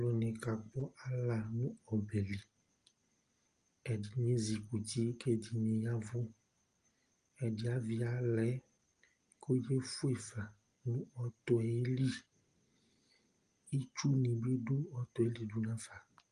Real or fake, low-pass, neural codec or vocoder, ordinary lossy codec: real; 9.9 kHz; none; Opus, 24 kbps